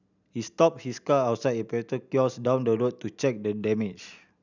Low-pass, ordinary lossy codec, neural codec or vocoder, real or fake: 7.2 kHz; none; none; real